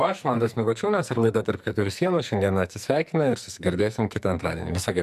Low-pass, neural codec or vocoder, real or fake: 14.4 kHz; codec, 44.1 kHz, 2.6 kbps, SNAC; fake